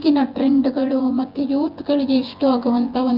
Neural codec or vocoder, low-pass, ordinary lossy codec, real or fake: vocoder, 24 kHz, 100 mel bands, Vocos; 5.4 kHz; Opus, 24 kbps; fake